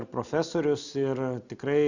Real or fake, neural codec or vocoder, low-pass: real; none; 7.2 kHz